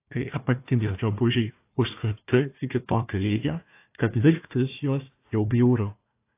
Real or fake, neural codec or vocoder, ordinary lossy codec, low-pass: fake; codec, 16 kHz, 1 kbps, FunCodec, trained on Chinese and English, 50 frames a second; AAC, 24 kbps; 3.6 kHz